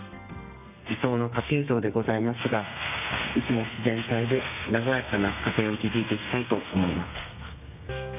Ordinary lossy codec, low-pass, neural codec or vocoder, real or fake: none; 3.6 kHz; codec, 32 kHz, 1.9 kbps, SNAC; fake